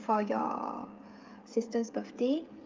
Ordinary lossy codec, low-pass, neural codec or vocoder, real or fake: Opus, 32 kbps; 7.2 kHz; codec, 16 kHz, 16 kbps, FreqCodec, smaller model; fake